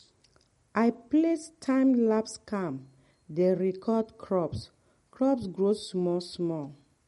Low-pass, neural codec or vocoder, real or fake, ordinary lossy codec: 19.8 kHz; none; real; MP3, 48 kbps